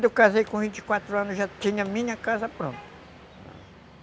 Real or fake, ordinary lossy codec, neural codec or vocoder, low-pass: real; none; none; none